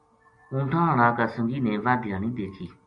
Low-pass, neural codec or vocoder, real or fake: 9.9 kHz; none; real